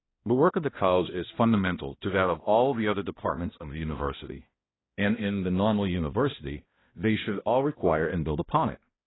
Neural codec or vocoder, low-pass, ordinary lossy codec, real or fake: codec, 16 kHz, 1 kbps, X-Codec, HuBERT features, trained on balanced general audio; 7.2 kHz; AAC, 16 kbps; fake